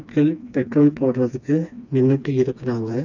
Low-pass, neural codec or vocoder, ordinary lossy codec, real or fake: 7.2 kHz; codec, 16 kHz, 2 kbps, FreqCodec, smaller model; none; fake